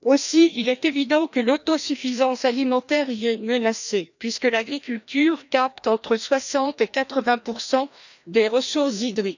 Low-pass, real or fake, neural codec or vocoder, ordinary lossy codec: 7.2 kHz; fake; codec, 16 kHz, 1 kbps, FreqCodec, larger model; none